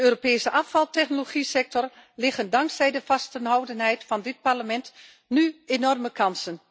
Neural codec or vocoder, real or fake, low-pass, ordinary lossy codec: none; real; none; none